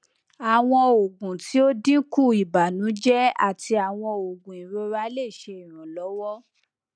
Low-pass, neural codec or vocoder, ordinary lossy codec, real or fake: 9.9 kHz; none; none; real